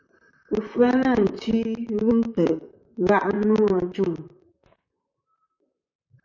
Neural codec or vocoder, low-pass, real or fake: vocoder, 44.1 kHz, 128 mel bands, Pupu-Vocoder; 7.2 kHz; fake